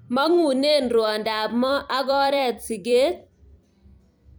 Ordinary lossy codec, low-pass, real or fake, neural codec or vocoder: none; none; fake; vocoder, 44.1 kHz, 128 mel bands every 256 samples, BigVGAN v2